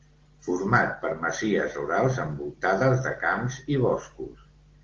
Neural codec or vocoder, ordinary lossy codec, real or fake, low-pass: none; Opus, 16 kbps; real; 7.2 kHz